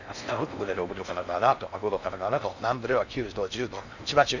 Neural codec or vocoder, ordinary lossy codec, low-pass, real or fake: codec, 16 kHz in and 24 kHz out, 0.6 kbps, FocalCodec, streaming, 4096 codes; none; 7.2 kHz; fake